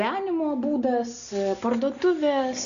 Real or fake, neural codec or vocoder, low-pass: real; none; 7.2 kHz